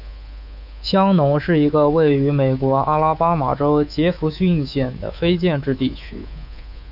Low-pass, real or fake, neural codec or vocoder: 5.4 kHz; fake; codec, 24 kHz, 3.1 kbps, DualCodec